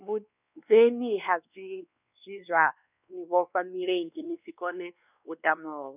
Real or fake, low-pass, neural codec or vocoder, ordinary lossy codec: fake; 3.6 kHz; codec, 16 kHz, 2 kbps, X-Codec, WavLM features, trained on Multilingual LibriSpeech; none